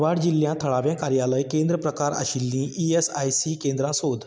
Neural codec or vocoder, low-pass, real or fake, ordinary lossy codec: none; none; real; none